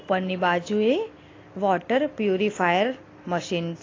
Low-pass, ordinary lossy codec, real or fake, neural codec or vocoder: 7.2 kHz; AAC, 32 kbps; real; none